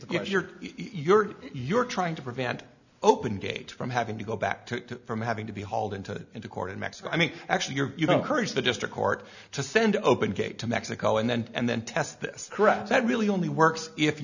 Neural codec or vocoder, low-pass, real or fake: none; 7.2 kHz; real